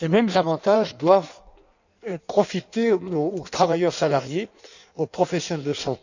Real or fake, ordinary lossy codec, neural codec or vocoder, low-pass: fake; none; codec, 16 kHz in and 24 kHz out, 1.1 kbps, FireRedTTS-2 codec; 7.2 kHz